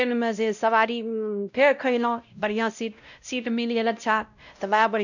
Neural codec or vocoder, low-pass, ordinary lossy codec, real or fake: codec, 16 kHz, 0.5 kbps, X-Codec, WavLM features, trained on Multilingual LibriSpeech; 7.2 kHz; none; fake